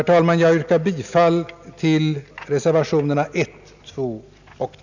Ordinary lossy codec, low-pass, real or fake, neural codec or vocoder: none; 7.2 kHz; real; none